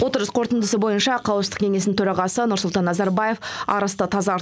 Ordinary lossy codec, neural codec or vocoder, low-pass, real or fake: none; none; none; real